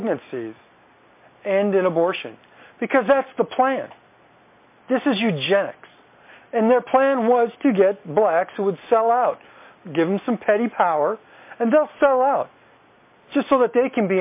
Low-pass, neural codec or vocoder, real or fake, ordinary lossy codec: 3.6 kHz; none; real; MP3, 24 kbps